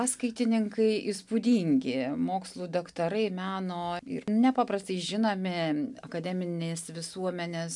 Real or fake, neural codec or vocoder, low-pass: real; none; 10.8 kHz